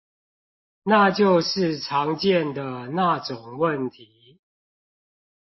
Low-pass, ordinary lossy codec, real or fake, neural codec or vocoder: 7.2 kHz; MP3, 24 kbps; real; none